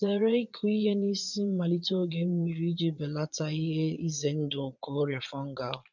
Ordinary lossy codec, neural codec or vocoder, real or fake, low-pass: none; vocoder, 22.05 kHz, 80 mel bands, Vocos; fake; 7.2 kHz